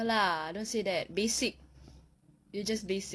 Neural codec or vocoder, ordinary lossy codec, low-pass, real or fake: none; none; none; real